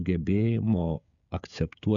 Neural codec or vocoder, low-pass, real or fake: codec, 16 kHz, 16 kbps, FreqCodec, smaller model; 7.2 kHz; fake